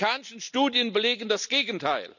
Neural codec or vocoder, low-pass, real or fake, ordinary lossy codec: none; 7.2 kHz; real; none